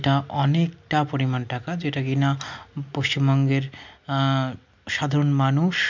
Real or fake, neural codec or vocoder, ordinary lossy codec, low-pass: real; none; MP3, 64 kbps; 7.2 kHz